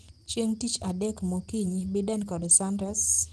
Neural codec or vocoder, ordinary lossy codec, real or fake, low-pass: none; Opus, 16 kbps; real; 10.8 kHz